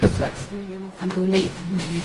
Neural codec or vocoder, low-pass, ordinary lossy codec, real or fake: codec, 16 kHz in and 24 kHz out, 0.4 kbps, LongCat-Audio-Codec, fine tuned four codebook decoder; 10.8 kHz; Opus, 32 kbps; fake